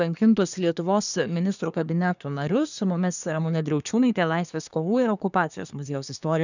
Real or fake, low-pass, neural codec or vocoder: fake; 7.2 kHz; codec, 24 kHz, 1 kbps, SNAC